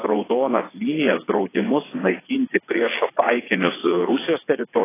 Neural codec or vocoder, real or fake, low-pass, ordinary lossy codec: vocoder, 22.05 kHz, 80 mel bands, WaveNeXt; fake; 3.6 kHz; AAC, 16 kbps